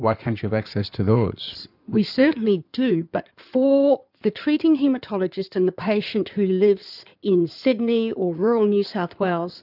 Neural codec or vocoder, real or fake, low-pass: codec, 16 kHz in and 24 kHz out, 2.2 kbps, FireRedTTS-2 codec; fake; 5.4 kHz